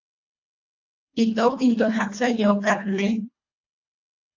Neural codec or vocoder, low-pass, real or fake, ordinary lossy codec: codec, 24 kHz, 1.5 kbps, HILCodec; 7.2 kHz; fake; AAC, 48 kbps